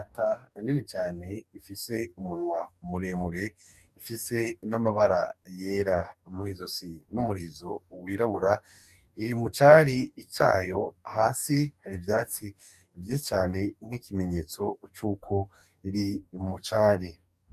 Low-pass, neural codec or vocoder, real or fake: 14.4 kHz; codec, 44.1 kHz, 2.6 kbps, DAC; fake